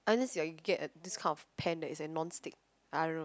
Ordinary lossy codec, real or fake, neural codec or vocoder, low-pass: none; real; none; none